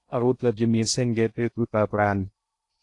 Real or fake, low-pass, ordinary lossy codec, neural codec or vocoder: fake; 10.8 kHz; AAC, 48 kbps; codec, 16 kHz in and 24 kHz out, 0.6 kbps, FocalCodec, streaming, 2048 codes